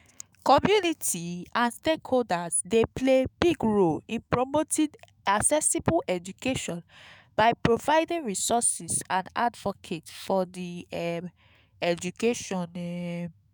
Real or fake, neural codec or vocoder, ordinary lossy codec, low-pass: fake; autoencoder, 48 kHz, 128 numbers a frame, DAC-VAE, trained on Japanese speech; none; none